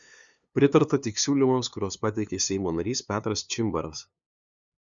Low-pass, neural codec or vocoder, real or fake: 7.2 kHz; codec, 16 kHz, 2 kbps, FunCodec, trained on LibriTTS, 25 frames a second; fake